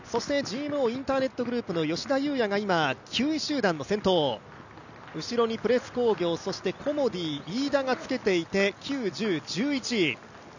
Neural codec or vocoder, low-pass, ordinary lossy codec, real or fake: none; 7.2 kHz; none; real